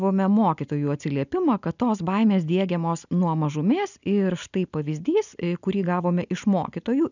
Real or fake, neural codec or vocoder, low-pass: real; none; 7.2 kHz